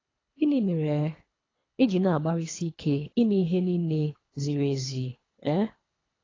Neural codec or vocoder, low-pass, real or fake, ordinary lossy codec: codec, 24 kHz, 3 kbps, HILCodec; 7.2 kHz; fake; AAC, 32 kbps